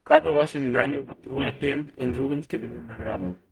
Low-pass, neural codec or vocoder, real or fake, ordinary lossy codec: 19.8 kHz; codec, 44.1 kHz, 0.9 kbps, DAC; fake; Opus, 32 kbps